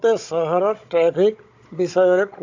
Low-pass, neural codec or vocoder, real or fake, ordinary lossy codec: 7.2 kHz; codec, 16 kHz, 16 kbps, FunCodec, trained on Chinese and English, 50 frames a second; fake; AAC, 48 kbps